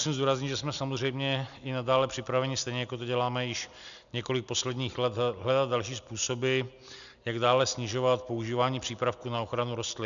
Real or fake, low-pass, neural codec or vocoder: real; 7.2 kHz; none